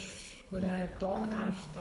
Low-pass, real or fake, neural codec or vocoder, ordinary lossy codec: 10.8 kHz; fake; codec, 24 kHz, 3 kbps, HILCodec; MP3, 64 kbps